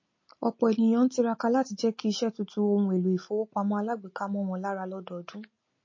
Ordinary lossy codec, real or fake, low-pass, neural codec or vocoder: MP3, 32 kbps; real; 7.2 kHz; none